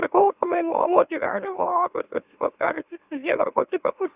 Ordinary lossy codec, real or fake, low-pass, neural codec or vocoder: Opus, 64 kbps; fake; 3.6 kHz; autoencoder, 44.1 kHz, a latent of 192 numbers a frame, MeloTTS